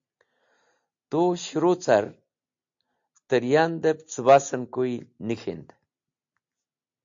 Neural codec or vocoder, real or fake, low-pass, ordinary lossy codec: none; real; 7.2 kHz; AAC, 64 kbps